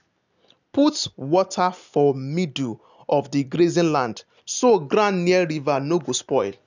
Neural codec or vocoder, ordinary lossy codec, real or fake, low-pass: none; none; real; 7.2 kHz